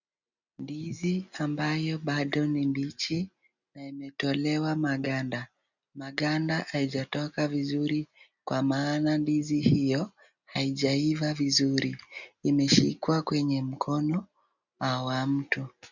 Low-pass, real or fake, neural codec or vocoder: 7.2 kHz; real; none